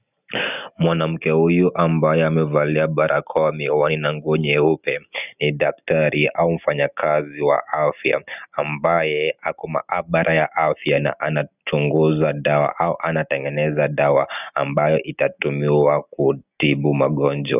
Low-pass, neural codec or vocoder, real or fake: 3.6 kHz; none; real